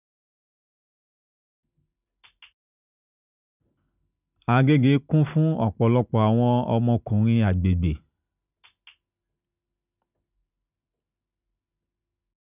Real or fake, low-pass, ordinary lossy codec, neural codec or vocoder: real; 3.6 kHz; none; none